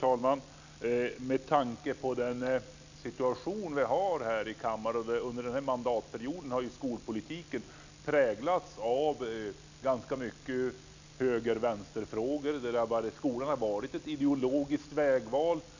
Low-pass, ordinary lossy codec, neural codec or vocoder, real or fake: 7.2 kHz; none; none; real